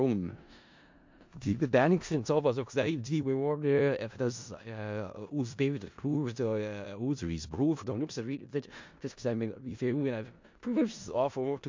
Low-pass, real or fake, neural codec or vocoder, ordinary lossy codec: 7.2 kHz; fake; codec, 16 kHz in and 24 kHz out, 0.4 kbps, LongCat-Audio-Codec, four codebook decoder; MP3, 48 kbps